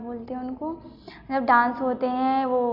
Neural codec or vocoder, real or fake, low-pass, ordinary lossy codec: none; real; 5.4 kHz; none